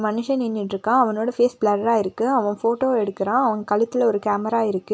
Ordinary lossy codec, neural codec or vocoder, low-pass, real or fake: none; none; none; real